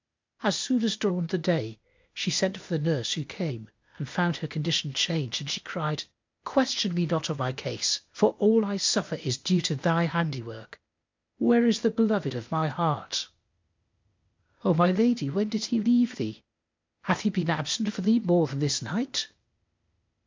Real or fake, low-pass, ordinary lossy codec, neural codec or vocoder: fake; 7.2 kHz; MP3, 64 kbps; codec, 16 kHz, 0.8 kbps, ZipCodec